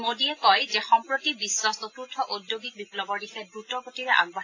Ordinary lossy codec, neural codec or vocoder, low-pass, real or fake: AAC, 32 kbps; none; 7.2 kHz; real